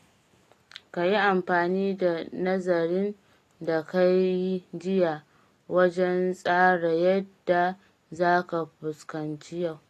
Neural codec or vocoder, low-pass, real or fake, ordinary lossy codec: none; 14.4 kHz; real; AAC, 48 kbps